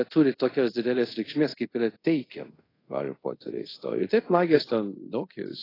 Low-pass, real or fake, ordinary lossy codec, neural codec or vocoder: 5.4 kHz; fake; AAC, 24 kbps; codec, 24 kHz, 0.5 kbps, DualCodec